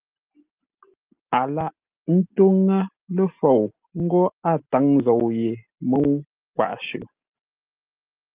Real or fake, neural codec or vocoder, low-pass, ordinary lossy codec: real; none; 3.6 kHz; Opus, 32 kbps